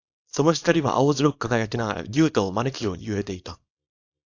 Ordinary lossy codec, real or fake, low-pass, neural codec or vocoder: AAC, 48 kbps; fake; 7.2 kHz; codec, 24 kHz, 0.9 kbps, WavTokenizer, small release